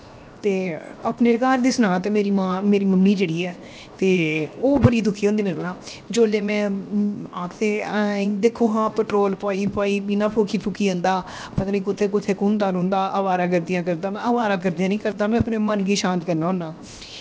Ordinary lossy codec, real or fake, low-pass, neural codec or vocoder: none; fake; none; codec, 16 kHz, 0.7 kbps, FocalCodec